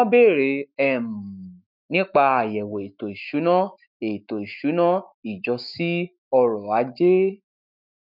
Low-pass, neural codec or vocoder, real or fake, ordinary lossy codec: 5.4 kHz; autoencoder, 48 kHz, 128 numbers a frame, DAC-VAE, trained on Japanese speech; fake; none